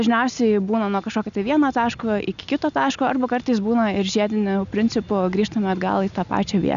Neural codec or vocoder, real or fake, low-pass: none; real; 7.2 kHz